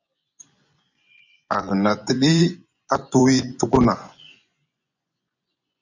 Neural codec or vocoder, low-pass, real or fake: vocoder, 24 kHz, 100 mel bands, Vocos; 7.2 kHz; fake